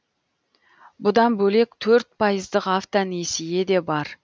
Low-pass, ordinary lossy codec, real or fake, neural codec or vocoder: none; none; real; none